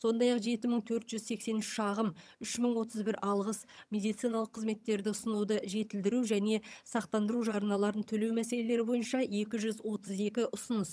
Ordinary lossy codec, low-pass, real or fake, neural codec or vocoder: none; none; fake; vocoder, 22.05 kHz, 80 mel bands, HiFi-GAN